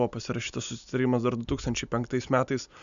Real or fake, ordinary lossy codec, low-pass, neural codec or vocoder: real; AAC, 96 kbps; 7.2 kHz; none